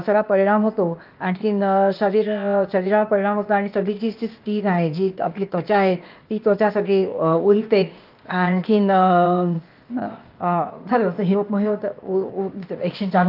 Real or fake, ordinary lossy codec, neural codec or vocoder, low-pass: fake; Opus, 32 kbps; codec, 16 kHz, 0.8 kbps, ZipCodec; 5.4 kHz